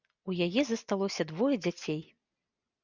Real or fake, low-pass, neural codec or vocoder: real; 7.2 kHz; none